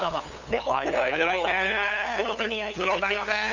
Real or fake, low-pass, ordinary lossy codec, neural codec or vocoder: fake; 7.2 kHz; none; codec, 16 kHz, 8 kbps, FunCodec, trained on LibriTTS, 25 frames a second